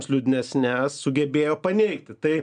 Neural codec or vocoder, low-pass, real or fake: none; 9.9 kHz; real